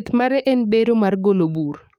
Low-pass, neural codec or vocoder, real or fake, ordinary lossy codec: 19.8 kHz; codec, 44.1 kHz, 7.8 kbps, DAC; fake; none